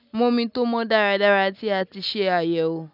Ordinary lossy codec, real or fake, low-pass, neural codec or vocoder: none; real; 5.4 kHz; none